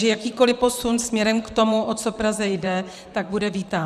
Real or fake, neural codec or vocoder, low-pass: fake; vocoder, 44.1 kHz, 128 mel bands, Pupu-Vocoder; 14.4 kHz